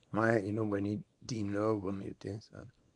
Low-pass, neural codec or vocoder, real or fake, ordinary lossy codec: 10.8 kHz; codec, 24 kHz, 0.9 kbps, WavTokenizer, small release; fake; AAC, 64 kbps